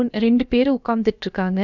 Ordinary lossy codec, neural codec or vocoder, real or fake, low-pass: none; codec, 16 kHz, about 1 kbps, DyCAST, with the encoder's durations; fake; 7.2 kHz